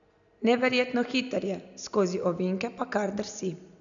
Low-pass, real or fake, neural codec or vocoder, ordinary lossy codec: 7.2 kHz; real; none; none